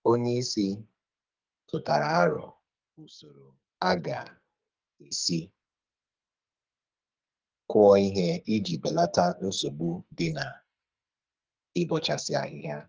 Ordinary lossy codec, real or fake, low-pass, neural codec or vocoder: Opus, 24 kbps; fake; 7.2 kHz; codec, 44.1 kHz, 2.6 kbps, SNAC